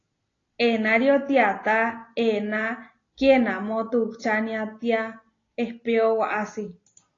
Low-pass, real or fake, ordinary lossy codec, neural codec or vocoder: 7.2 kHz; real; AAC, 32 kbps; none